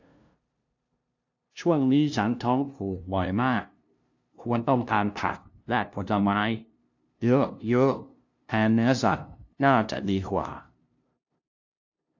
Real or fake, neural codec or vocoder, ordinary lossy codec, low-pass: fake; codec, 16 kHz, 0.5 kbps, FunCodec, trained on LibriTTS, 25 frames a second; AAC, 48 kbps; 7.2 kHz